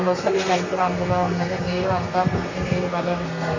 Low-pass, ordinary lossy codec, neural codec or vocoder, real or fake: 7.2 kHz; MP3, 32 kbps; codec, 32 kHz, 1.9 kbps, SNAC; fake